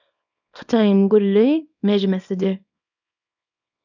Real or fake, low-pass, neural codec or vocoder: fake; 7.2 kHz; codec, 24 kHz, 0.9 kbps, WavTokenizer, small release